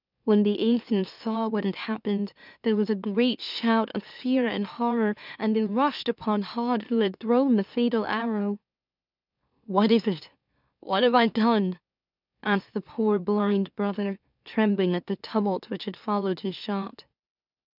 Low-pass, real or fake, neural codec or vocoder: 5.4 kHz; fake; autoencoder, 44.1 kHz, a latent of 192 numbers a frame, MeloTTS